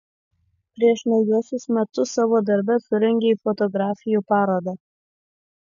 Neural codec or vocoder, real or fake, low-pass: none; real; 7.2 kHz